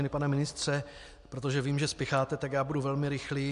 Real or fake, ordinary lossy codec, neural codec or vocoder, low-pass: real; MP3, 64 kbps; none; 10.8 kHz